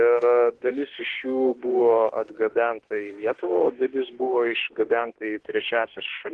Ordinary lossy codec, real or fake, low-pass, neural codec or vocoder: Opus, 16 kbps; fake; 10.8 kHz; autoencoder, 48 kHz, 32 numbers a frame, DAC-VAE, trained on Japanese speech